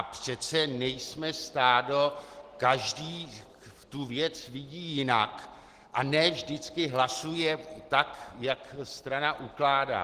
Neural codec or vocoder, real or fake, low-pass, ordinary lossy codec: none; real; 14.4 kHz; Opus, 16 kbps